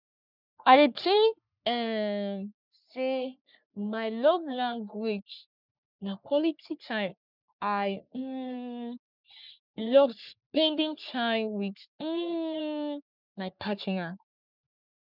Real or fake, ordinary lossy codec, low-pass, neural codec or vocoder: fake; none; 5.4 kHz; codec, 44.1 kHz, 3.4 kbps, Pupu-Codec